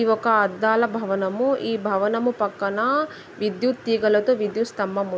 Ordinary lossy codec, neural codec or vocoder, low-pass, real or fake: none; none; none; real